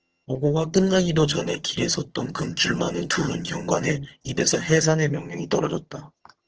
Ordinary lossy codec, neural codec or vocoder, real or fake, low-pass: Opus, 16 kbps; vocoder, 22.05 kHz, 80 mel bands, HiFi-GAN; fake; 7.2 kHz